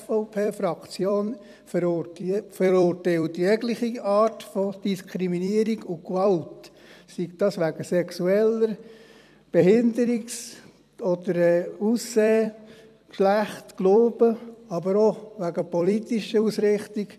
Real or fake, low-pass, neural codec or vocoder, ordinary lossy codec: fake; 14.4 kHz; vocoder, 44.1 kHz, 128 mel bands every 256 samples, BigVGAN v2; none